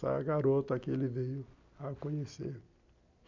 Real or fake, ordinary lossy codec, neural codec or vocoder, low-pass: real; MP3, 64 kbps; none; 7.2 kHz